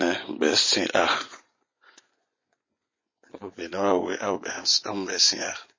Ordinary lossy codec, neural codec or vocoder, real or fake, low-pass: MP3, 32 kbps; vocoder, 24 kHz, 100 mel bands, Vocos; fake; 7.2 kHz